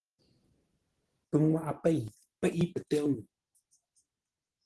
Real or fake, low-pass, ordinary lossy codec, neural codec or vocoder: real; 10.8 kHz; Opus, 16 kbps; none